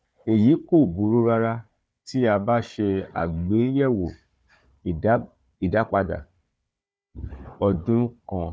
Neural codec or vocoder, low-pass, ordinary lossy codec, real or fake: codec, 16 kHz, 4 kbps, FunCodec, trained on Chinese and English, 50 frames a second; none; none; fake